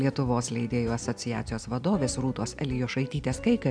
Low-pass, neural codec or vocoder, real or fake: 9.9 kHz; none; real